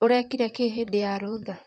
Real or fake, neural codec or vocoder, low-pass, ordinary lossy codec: fake; vocoder, 44.1 kHz, 128 mel bands, Pupu-Vocoder; 9.9 kHz; none